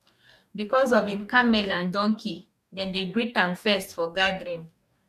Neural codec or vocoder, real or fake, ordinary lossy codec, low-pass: codec, 44.1 kHz, 2.6 kbps, DAC; fake; none; 14.4 kHz